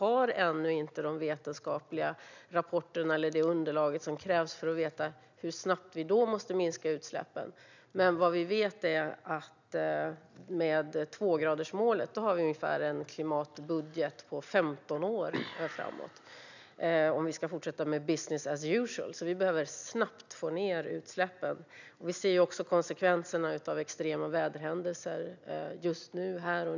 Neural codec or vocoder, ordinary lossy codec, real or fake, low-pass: none; none; real; 7.2 kHz